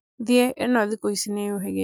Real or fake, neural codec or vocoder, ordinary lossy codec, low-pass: real; none; none; none